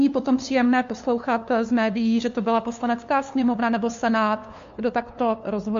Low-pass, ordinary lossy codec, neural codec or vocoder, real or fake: 7.2 kHz; MP3, 48 kbps; codec, 16 kHz, 2 kbps, FunCodec, trained on LibriTTS, 25 frames a second; fake